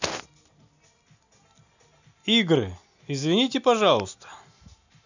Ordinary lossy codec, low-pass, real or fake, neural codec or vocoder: none; 7.2 kHz; real; none